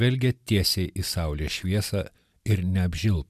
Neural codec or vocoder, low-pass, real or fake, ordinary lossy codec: none; 14.4 kHz; real; MP3, 96 kbps